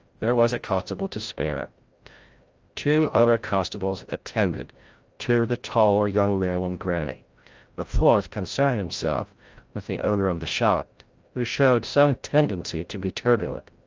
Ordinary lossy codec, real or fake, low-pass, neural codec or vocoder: Opus, 24 kbps; fake; 7.2 kHz; codec, 16 kHz, 0.5 kbps, FreqCodec, larger model